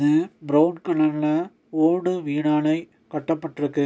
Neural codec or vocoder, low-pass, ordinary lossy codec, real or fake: none; none; none; real